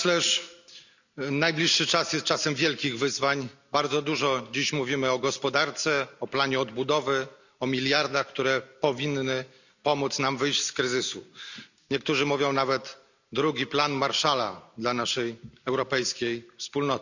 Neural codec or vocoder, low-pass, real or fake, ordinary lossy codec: none; 7.2 kHz; real; none